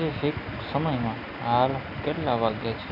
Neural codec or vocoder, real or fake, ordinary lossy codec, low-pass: none; real; none; 5.4 kHz